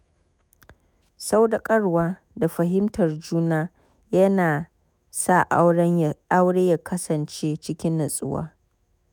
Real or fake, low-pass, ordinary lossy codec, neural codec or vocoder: fake; none; none; autoencoder, 48 kHz, 128 numbers a frame, DAC-VAE, trained on Japanese speech